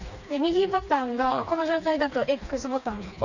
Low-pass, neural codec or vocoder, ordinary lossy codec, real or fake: 7.2 kHz; codec, 16 kHz, 2 kbps, FreqCodec, smaller model; none; fake